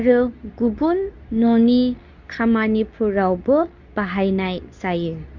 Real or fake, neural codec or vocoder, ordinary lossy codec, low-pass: fake; codec, 24 kHz, 0.9 kbps, WavTokenizer, medium speech release version 2; Opus, 64 kbps; 7.2 kHz